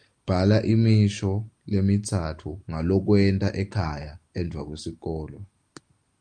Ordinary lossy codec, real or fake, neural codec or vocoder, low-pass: Opus, 32 kbps; real; none; 9.9 kHz